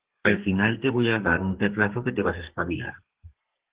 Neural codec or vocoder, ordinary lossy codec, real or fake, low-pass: codec, 32 kHz, 1.9 kbps, SNAC; Opus, 16 kbps; fake; 3.6 kHz